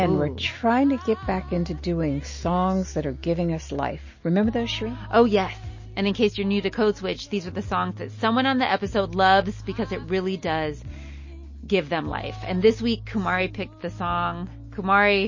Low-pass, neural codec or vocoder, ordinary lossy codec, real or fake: 7.2 kHz; none; MP3, 32 kbps; real